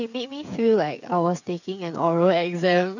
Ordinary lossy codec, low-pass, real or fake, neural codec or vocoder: none; 7.2 kHz; fake; codec, 16 kHz, 8 kbps, FreqCodec, smaller model